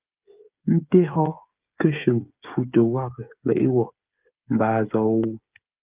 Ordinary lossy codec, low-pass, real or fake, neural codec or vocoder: Opus, 32 kbps; 3.6 kHz; fake; codec, 16 kHz, 8 kbps, FreqCodec, smaller model